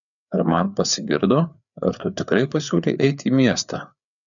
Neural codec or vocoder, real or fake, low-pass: codec, 16 kHz, 4 kbps, FreqCodec, larger model; fake; 7.2 kHz